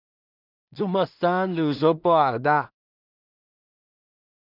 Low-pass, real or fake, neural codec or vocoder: 5.4 kHz; fake; codec, 16 kHz in and 24 kHz out, 0.4 kbps, LongCat-Audio-Codec, two codebook decoder